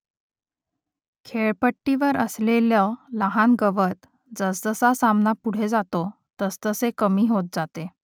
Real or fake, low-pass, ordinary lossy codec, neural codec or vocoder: real; 19.8 kHz; none; none